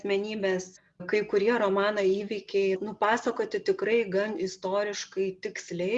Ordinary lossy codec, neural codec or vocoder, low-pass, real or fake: Opus, 64 kbps; none; 10.8 kHz; real